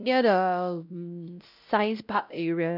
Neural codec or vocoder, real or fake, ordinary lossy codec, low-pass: codec, 16 kHz, 0.5 kbps, X-Codec, WavLM features, trained on Multilingual LibriSpeech; fake; none; 5.4 kHz